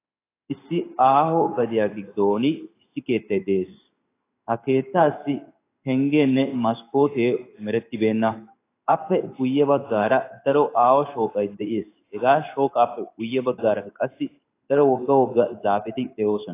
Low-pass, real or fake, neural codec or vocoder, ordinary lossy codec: 3.6 kHz; fake; codec, 16 kHz in and 24 kHz out, 1 kbps, XY-Tokenizer; AAC, 24 kbps